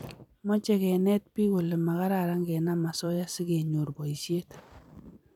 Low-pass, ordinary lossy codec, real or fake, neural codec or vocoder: 19.8 kHz; none; fake; vocoder, 44.1 kHz, 128 mel bands every 256 samples, BigVGAN v2